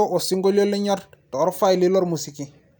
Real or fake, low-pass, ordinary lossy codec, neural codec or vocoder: real; none; none; none